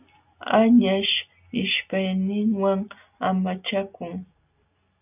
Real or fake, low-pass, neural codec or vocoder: real; 3.6 kHz; none